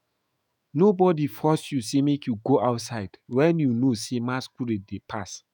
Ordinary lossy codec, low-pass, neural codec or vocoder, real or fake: none; none; autoencoder, 48 kHz, 128 numbers a frame, DAC-VAE, trained on Japanese speech; fake